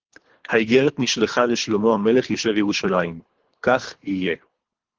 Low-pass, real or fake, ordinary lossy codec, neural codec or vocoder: 7.2 kHz; fake; Opus, 16 kbps; codec, 24 kHz, 3 kbps, HILCodec